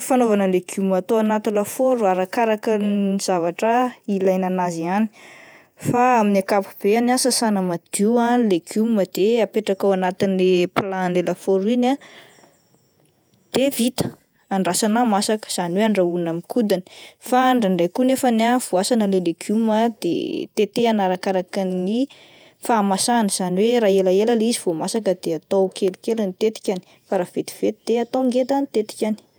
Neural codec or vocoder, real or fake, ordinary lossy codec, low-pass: vocoder, 48 kHz, 128 mel bands, Vocos; fake; none; none